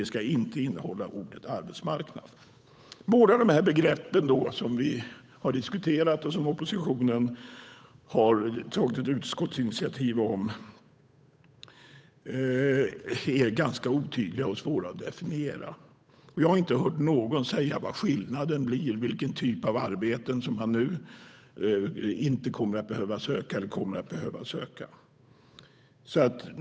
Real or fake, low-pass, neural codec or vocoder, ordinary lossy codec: fake; none; codec, 16 kHz, 8 kbps, FunCodec, trained on Chinese and English, 25 frames a second; none